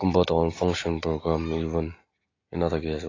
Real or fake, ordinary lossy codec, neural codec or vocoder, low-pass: real; AAC, 32 kbps; none; 7.2 kHz